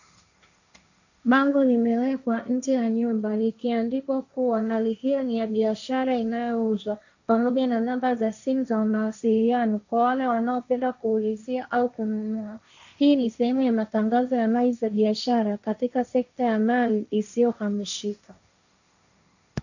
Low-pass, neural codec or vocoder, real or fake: 7.2 kHz; codec, 16 kHz, 1.1 kbps, Voila-Tokenizer; fake